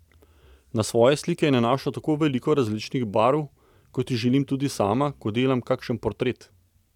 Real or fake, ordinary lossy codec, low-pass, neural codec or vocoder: real; none; 19.8 kHz; none